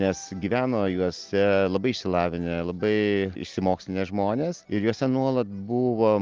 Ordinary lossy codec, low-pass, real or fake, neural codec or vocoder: Opus, 24 kbps; 7.2 kHz; real; none